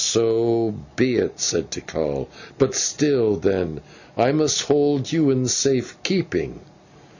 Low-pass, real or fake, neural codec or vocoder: 7.2 kHz; real; none